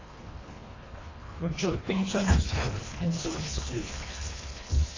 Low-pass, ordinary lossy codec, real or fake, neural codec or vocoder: 7.2 kHz; AAC, 32 kbps; fake; codec, 24 kHz, 1.5 kbps, HILCodec